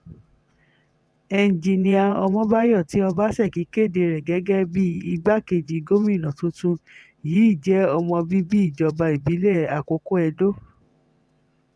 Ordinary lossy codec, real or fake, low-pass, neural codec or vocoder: none; fake; none; vocoder, 22.05 kHz, 80 mel bands, WaveNeXt